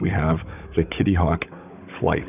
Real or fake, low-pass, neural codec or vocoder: fake; 3.6 kHz; codec, 16 kHz, 16 kbps, FunCodec, trained on Chinese and English, 50 frames a second